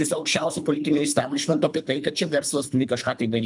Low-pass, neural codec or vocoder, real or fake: 10.8 kHz; codec, 24 kHz, 3 kbps, HILCodec; fake